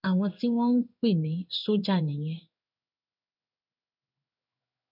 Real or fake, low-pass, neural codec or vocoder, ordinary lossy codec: fake; 5.4 kHz; codec, 16 kHz in and 24 kHz out, 1 kbps, XY-Tokenizer; none